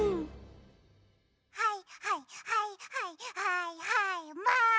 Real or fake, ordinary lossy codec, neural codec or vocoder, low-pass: real; none; none; none